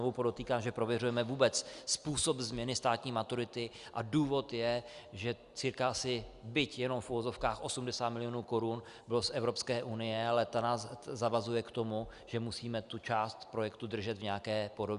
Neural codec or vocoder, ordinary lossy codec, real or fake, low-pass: none; Opus, 64 kbps; real; 10.8 kHz